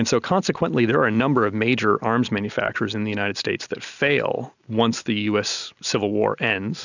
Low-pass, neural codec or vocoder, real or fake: 7.2 kHz; none; real